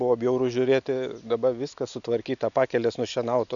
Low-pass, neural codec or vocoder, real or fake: 7.2 kHz; none; real